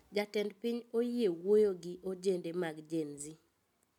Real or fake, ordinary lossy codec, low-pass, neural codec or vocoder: real; none; 19.8 kHz; none